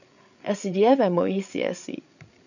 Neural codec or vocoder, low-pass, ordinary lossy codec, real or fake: codec, 16 kHz, 8 kbps, FreqCodec, larger model; 7.2 kHz; none; fake